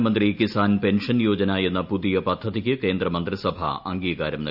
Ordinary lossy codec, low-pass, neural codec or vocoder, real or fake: none; 5.4 kHz; none; real